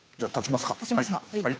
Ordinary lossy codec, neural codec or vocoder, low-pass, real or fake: none; codec, 16 kHz, 2 kbps, FunCodec, trained on Chinese and English, 25 frames a second; none; fake